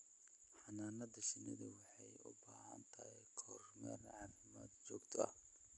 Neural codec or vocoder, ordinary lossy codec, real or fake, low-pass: none; none; real; 10.8 kHz